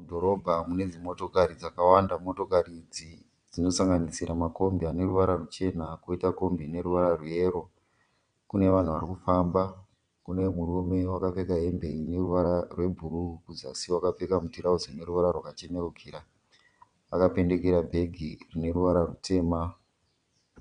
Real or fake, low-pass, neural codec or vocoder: fake; 9.9 kHz; vocoder, 22.05 kHz, 80 mel bands, WaveNeXt